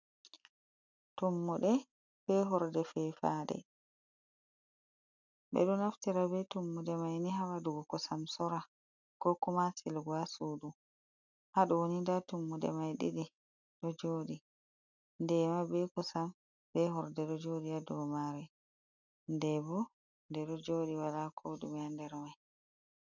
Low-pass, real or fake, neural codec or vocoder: 7.2 kHz; real; none